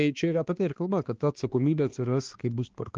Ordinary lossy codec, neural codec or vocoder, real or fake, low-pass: Opus, 16 kbps; codec, 16 kHz, 2 kbps, X-Codec, HuBERT features, trained on balanced general audio; fake; 7.2 kHz